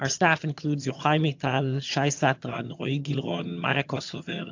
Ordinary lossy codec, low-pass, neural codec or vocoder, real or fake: AAC, 48 kbps; 7.2 kHz; vocoder, 22.05 kHz, 80 mel bands, HiFi-GAN; fake